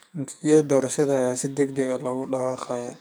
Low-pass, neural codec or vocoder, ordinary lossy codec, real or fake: none; codec, 44.1 kHz, 2.6 kbps, SNAC; none; fake